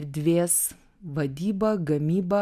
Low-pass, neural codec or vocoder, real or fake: 14.4 kHz; none; real